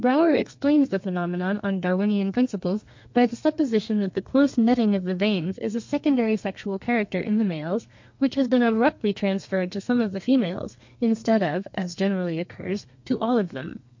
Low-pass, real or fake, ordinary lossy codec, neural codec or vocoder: 7.2 kHz; fake; MP3, 48 kbps; codec, 32 kHz, 1.9 kbps, SNAC